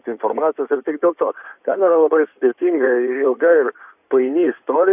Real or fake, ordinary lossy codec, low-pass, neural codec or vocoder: fake; AAC, 32 kbps; 3.6 kHz; codec, 16 kHz in and 24 kHz out, 2.2 kbps, FireRedTTS-2 codec